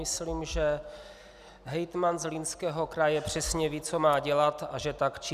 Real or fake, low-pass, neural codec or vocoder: real; 14.4 kHz; none